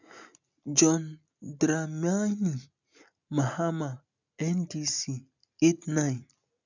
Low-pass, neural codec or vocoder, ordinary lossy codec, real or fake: 7.2 kHz; none; none; real